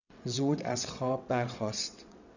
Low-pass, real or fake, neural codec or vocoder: 7.2 kHz; fake; vocoder, 22.05 kHz, 80 mel bands, WaveNeXt